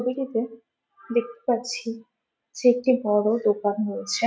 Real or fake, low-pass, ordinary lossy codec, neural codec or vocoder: real; none; none; none